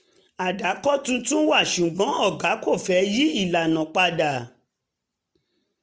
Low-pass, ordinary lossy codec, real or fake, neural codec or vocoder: none; none; real; none